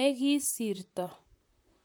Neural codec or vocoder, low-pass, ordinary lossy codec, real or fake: vocoder, 44.1 kHz, 128 mel bands, Pupu-Vocoder; none; none; fake